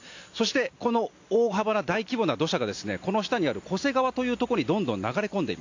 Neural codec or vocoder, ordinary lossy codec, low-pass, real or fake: none; AAC, 48 kbps; 7.2 kHz; real